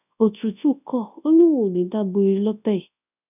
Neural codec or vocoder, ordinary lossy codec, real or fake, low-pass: codec, 24 kHz, 0.9 kbps, WavTokenizer, large speech release; none; fake; 3.6 kHz